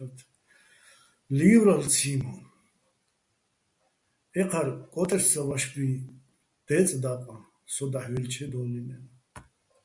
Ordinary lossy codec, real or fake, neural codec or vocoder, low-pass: MP3, 96 kbps; real; none; 10.8 kHz